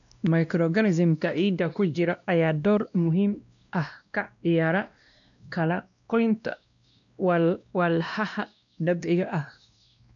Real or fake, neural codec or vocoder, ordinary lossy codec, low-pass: fake; codec, 16 kHz, 1 kbps, X-Codec, WavLM features, trained on Multilingual LibriSpeech; none; 7.2 kHz